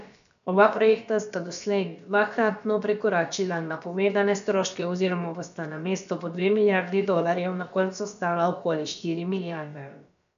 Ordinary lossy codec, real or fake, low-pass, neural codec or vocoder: none; fake; 7.2 kHz; codec, 16 kHz, about 1 kbps, DyCAST, with the encoder's durations